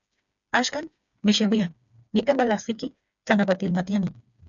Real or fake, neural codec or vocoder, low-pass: fake; codec, 16 kHz, 4 kbps, FreqCodec, smaller model; 7.2 kHz